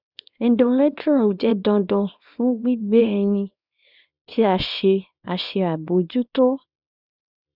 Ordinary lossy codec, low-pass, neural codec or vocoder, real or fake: none; 5.4 kHz; codec, 24 kHz, 0.9 kbps, WavTokenizer, small release; fake